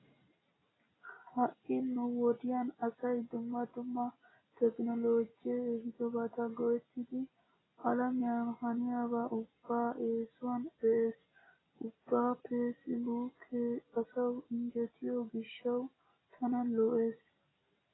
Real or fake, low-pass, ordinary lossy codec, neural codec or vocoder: real; 7.2 kHz; AAC, 16 kbps; none